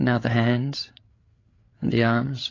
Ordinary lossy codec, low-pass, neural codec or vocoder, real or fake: AAC, 32 kbps; 7.2 kHz; codec, 16 kHz, 8 kbps, FreqCodec, larger model; fake